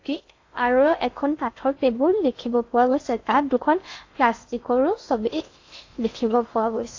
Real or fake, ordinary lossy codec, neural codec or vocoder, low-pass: fake; AAC, 48 kbps; codec, 16 kHz in and 24 kHz out, 0.6 kbps, FocalCodec, streaming, 2048 codes; 7.2 kHz